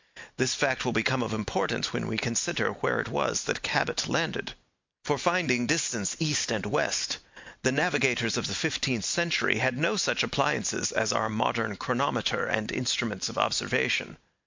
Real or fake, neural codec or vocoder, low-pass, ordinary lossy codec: real; none; 7.2 kHz; MP3, 64 kbps